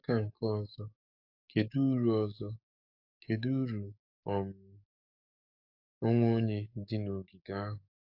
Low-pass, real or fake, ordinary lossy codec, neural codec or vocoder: 5.4 kHz; fake; AAC, 48 kbps; codec, 44.1 kHz, 7.8 kbps, DAC